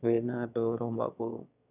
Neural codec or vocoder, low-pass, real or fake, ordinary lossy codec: autoencoder, 22.05 kHz, a latent of 192 numbers a frame, VITS, trained on one speaker; 3.6 kHz; fake; none